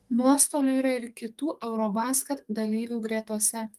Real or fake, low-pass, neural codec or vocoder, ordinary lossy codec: fake; 14.4 kHz; codec, 44.1 kHz, 2.6 kbps, SNAC; Opus, 32 kbps